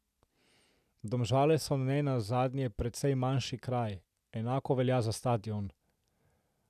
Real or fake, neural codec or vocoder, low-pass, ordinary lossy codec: real; none; 14.4 kHz; none